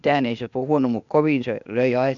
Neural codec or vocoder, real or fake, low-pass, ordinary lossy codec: codec, 16 kHz, 0.8 kbps, ZipCodec; fake; 7.2 kHz; none